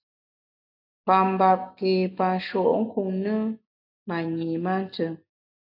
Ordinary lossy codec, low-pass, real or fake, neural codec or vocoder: AAC, 48 kbps; 5.4 kHz; real; none